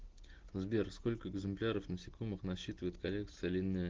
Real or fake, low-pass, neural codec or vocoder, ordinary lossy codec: real; 7.2 kHz; none; Opus, 16 kbps